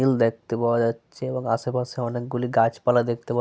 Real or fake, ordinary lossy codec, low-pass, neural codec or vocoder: real; none; none; none